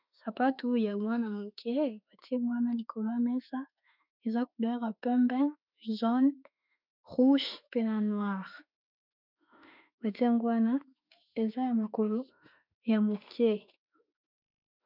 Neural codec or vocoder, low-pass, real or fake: autoencoder, 48 kHz, 32 numbers a frame, DAC-VAE, trained on Japanese speech; 5.4 kHz; fake